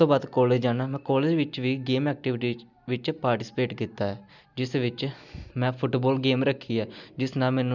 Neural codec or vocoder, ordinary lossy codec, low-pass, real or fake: none; none; 7.2 kHz; real